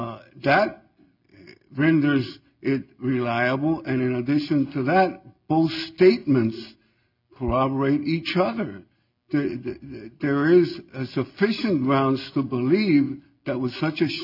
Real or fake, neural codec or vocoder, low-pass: real; none; 5.4 kHz